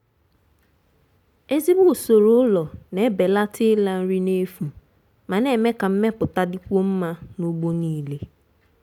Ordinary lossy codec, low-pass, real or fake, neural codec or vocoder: none; 19.8 kHz; real; none